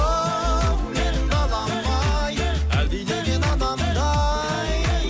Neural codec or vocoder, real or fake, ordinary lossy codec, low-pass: none; real; none; none